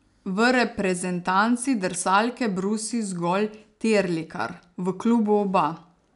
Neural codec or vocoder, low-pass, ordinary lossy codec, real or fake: none; 10.8 kHz; MP3, 96 kbps; real